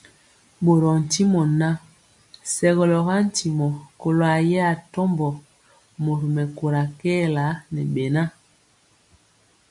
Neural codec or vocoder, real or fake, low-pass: none; real; 10.8 kHz